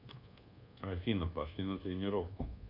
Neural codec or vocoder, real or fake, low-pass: codec, 24 kHz, 1.2 kbps, DualCodec; fake; 5.4 kHz